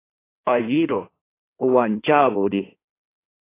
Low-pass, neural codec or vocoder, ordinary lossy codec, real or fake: 3.6 kHz; codec, 16 kHz in and 24 kHz out, 1.1 kbps, FireRedTTS-2 codec; AAC, 24 kbps; fake